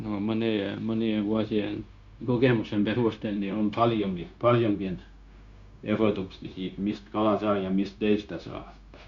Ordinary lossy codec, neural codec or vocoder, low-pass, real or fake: Opus, 64 kbps; codec, 16 kHz, 0.9 kbps, LongCat-Audio-Codec; 7.2 kHz; fake